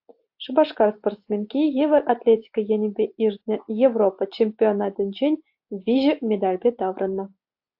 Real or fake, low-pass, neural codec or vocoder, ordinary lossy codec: real; 5.4 kHz; none; AAC, 48 kbps